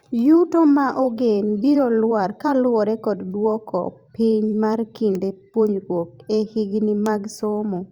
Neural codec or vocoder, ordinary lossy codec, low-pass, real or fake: vocoder, 44.1 kHz, 128 mel bands every 512 samples, BigVGAN v2; Opus, 64 kbps; 19.8 kHz; fake